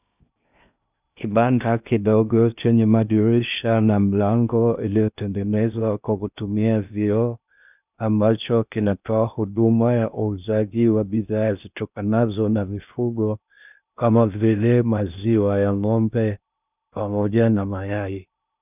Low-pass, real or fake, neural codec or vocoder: 3.6 kHz; fake; codec, 16 kHz in and 24 kHz out, 0.6 kbps, FocalCodec, streaming, 2048 codes